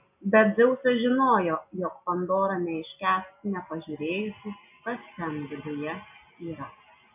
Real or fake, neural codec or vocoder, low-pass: real; none; 3.6 kHz